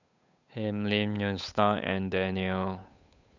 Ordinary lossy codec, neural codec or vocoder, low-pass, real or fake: none; codec, 16 kHz, 8 kbps, FunCodec, trained on Chinese and English, 25 frames a second; 7.2 kHz; fake